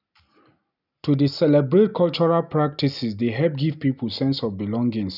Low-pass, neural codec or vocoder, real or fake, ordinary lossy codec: 5.4 kHz; none; real; none